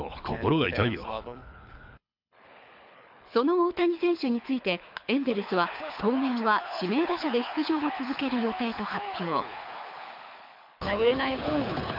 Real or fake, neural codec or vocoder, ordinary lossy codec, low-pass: fake; codec, 24 kHz, 6 kbps, HILCodec; MP3, 48 kbps; 5.4 kHz